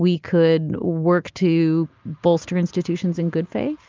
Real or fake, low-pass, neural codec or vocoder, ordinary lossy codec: real; 7.2 kHz; none; Opus, 24 kbps